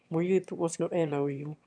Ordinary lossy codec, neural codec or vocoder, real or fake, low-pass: none; autoencoder, 22.05 kHz, a latent of 192 numbers a frame, VITS, trained on one speaker; fake; 9.9 kHz